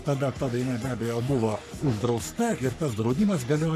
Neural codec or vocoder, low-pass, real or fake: codec, 44.1 kHz, 3.4 kbps, Pupu-Codec; 14.4 kHz; fake